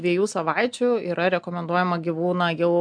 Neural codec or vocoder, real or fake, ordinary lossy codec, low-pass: none; real; MP3, 64 kbps; 9.9 kHz